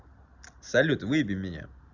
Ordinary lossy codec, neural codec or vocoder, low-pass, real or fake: none; none; 7.2 kHz; real